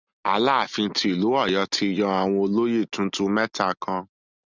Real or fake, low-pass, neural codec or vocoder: real; 7.2 kHz; none